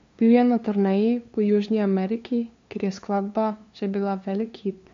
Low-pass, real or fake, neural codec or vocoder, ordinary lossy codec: 7.2 kHz; fake; codec, 16 kHz, 2 kbps, FunCodec, trained on LibriTTS, 25 frames a second; MP3, 48 kbps